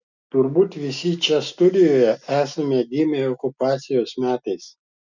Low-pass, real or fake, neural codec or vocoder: 7.2 kHz; real; none